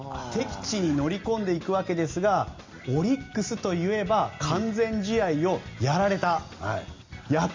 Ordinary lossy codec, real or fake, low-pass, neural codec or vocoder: MP3, 64 kbps; real; 7.2 kHz; none